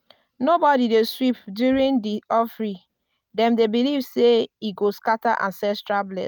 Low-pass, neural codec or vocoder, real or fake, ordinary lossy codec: 19.8 kHz; none; real; none